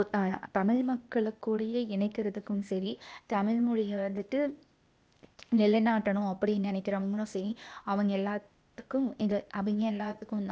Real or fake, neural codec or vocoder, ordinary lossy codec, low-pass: fake; codec, 16 kHz, 0.8 kbps, ZipCodec; none; none